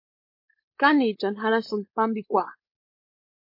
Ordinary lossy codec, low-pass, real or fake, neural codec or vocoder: MP3, 32 kbps; 5.4 kHz; fake; codec, 16 kHz, 4.8 kbps, FACodec